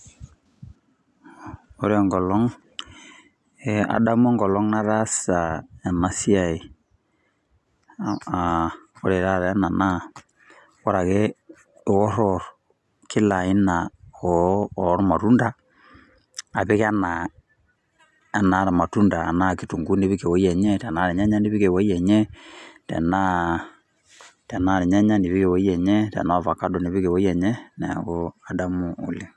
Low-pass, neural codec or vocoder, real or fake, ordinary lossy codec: none; none; real; none